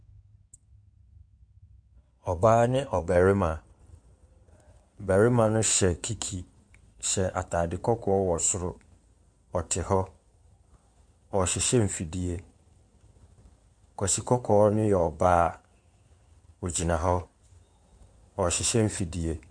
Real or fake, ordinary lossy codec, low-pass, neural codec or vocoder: fake; MP3, 64 kbps; 9.9 kHz; codec, 16 kHz in and 24 kHz out, 2.2 kbps, FireRedTTS-2 codec